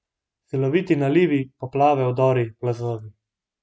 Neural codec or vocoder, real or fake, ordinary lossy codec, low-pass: none; real; none; none